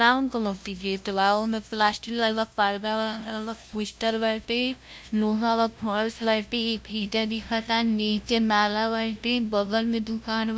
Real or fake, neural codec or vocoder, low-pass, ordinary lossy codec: fake; codec, 16 kHz, 0.5 kbps, FunCodec, trained on LibriTTS, 25 frames a second; none; none